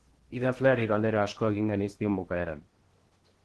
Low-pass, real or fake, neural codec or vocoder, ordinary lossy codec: 10.8 kHz; fake; codec, 16 kHz in and 24 kHz out, 0.8 kbps, FocalCodec, streaming, 65536 codes; Opus, 16 kbps